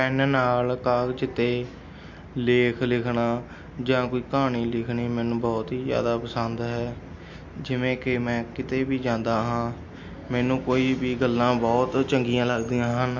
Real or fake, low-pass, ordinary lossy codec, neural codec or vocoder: real; 7.2 kHz; MP3, 48 kbps; none